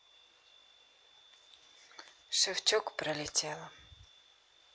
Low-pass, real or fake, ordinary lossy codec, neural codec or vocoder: none; real; none; none